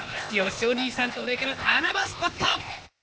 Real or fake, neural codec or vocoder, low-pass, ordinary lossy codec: fake; codec, 16 kHz, 0.8 kbps, ZipCodec; none; none